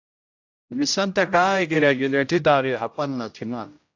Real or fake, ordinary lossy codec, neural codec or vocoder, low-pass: fake; AAC, 48 kbps; codec, 16 kHz, 0.5 kbps, X-Codec, HuBERT features, trained on general audio; 7.2 kHz